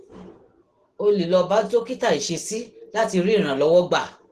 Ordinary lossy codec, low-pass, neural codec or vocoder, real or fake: Opus, 16 kbps; 14.4 kHz; none; real